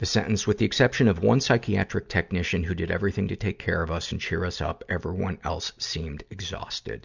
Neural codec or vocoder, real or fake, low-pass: none; real; 7.2 kHz